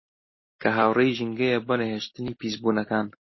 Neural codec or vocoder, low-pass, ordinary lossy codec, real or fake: none; 7.2 kHz; MP3, 24 kbps; real